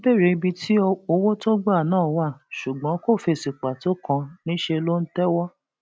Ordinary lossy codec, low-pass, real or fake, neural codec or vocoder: none; none; real; none